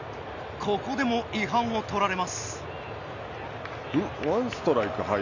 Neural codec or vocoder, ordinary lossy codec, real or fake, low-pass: none; none; real; 7.2 kHz